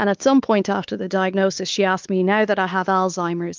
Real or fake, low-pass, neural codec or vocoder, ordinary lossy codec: fake; 7.2 kHz; codec, 16 kHz, 4 kbps, X-Codec, WavLM features, trained on Multilingual LibriSpeech; Opus, 24 kbps